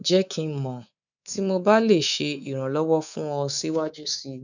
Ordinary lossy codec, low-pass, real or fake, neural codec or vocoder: none; 7.2 kHz; fake; codec, 24 kHz, 3.1 kbps, DualCodec